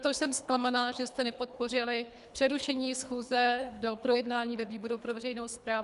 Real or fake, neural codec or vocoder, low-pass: fake; codec, 24 kHz, 3 kbps, HILCodec; 10.8 kHz